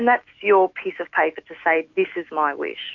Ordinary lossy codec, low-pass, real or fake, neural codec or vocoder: AAC, 48 kbps; 7.2 kHz; fake; autoencoder, 48 kHz, 128 numbers a frame, DAC-VAE, trained on Japanese speech